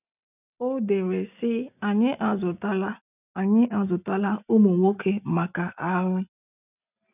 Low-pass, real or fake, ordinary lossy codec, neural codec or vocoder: 3.6 kHz; real; none; none